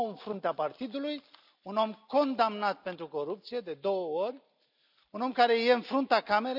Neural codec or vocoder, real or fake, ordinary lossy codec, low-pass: none; real; none; 5.4 kHz